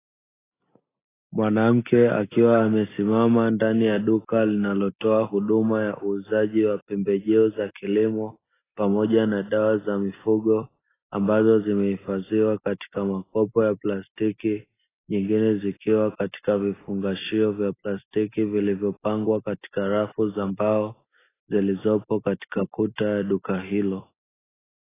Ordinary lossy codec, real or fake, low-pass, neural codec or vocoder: AAC, 16 kbps; real; 3.6 kHz; none